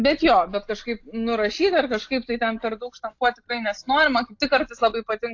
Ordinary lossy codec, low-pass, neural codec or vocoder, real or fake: AAC, 48 kbps; 7.2 kHz; none; real